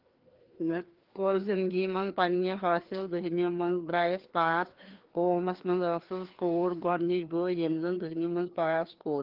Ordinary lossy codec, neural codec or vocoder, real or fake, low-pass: Opus, 16 kbps; codec, 16 kHz, 2 kbps, FreqCodec, larger model; fake; 5.4 kHz